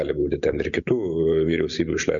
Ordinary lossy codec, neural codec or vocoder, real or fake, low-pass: MP3, 96 kbps; none; real; 7.2 kHz